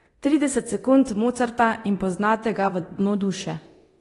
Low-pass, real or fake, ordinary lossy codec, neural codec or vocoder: 10.8 kHz; fake; AAC, 32 kbps; codec, 24 kHz, 0.9 kbps, DualCodec